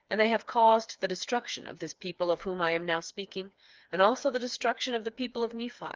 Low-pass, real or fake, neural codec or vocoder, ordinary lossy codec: 7.2 kHz; fake; codec, 16 kHz, 4 kbps, FreqCodec, smaller model; Opus, 24 kbps